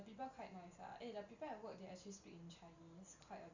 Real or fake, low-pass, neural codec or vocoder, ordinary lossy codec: real; 7.2 kHz; none; Opus, 64 kbps